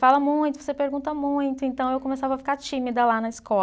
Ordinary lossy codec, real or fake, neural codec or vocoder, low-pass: none; real; none; none